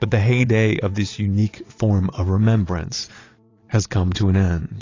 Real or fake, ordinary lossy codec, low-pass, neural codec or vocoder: fake; AAC, 32 kbps; 7.2 kHz; vocoder, 22.05 kHz, 80 mel bands, Vocos